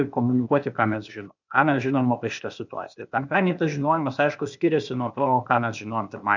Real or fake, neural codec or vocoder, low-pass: fake; codec, 16 kHz, 0.8 kbps, ZipCodec; 7.2 kHz